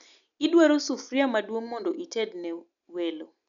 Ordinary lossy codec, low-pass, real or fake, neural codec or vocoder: none; 7.2 kHz; real; none